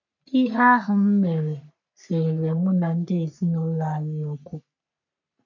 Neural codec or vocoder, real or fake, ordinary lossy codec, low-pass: codec, 44.1 kHz, 3.4 kbps, Pupu-Codec; fake; none; 7.2 kHz